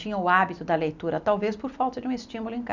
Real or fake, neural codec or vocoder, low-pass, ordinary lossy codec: real; none; 7.2 kHz; none